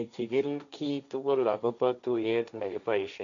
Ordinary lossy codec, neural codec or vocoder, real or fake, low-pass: none; codec, 16 kHz, 1.1 kbps, Voila-Tokenizer; fake; 7.2 kHz